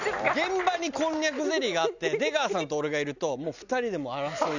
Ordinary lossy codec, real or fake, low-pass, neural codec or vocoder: none; real; 7.2 kHz; none